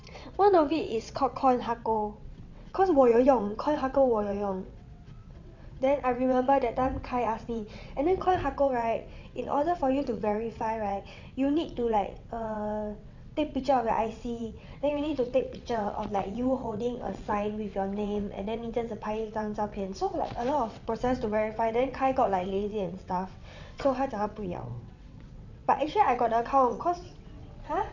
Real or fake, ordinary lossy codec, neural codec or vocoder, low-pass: fake; none; vocoder, 22.05 kHz, 80 mel bands, WaveNeXt; 7.2 kHz